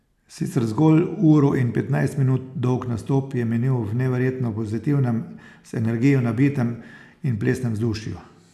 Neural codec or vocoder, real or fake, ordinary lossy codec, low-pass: none; real; none; 14.4 kHz